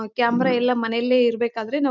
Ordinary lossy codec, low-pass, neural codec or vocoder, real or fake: none; 7.2 kHz; none; real